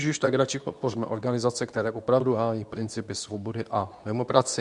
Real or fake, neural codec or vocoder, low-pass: fake; codec, 24 kHz, 0.9 kbps, WavTokenizer, medium speech release version 2; 10.8 kHz